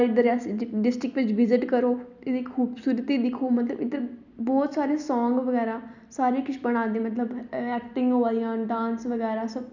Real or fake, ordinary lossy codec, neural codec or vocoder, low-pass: real; none; none; 7.2 kHz